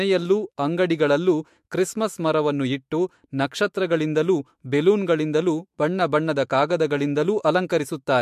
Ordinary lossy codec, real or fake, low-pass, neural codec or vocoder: MP3, 64 kbps; fake; 14.4 kHz; autoencoder, 48 kHz, 128 numbers a frame, DAC-VAE, trained on Japanese speech